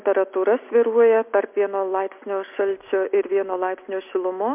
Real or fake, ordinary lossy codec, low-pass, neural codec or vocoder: real; MP3, 32 kbps; 3.6 kHz; none